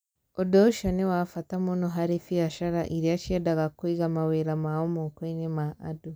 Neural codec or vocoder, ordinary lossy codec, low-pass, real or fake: none; none; none; real